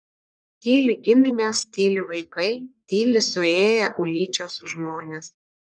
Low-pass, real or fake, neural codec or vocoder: 9.9 kHz; fake; codec, 44.1 kHz, 1.7 kbps, Pupu-Codec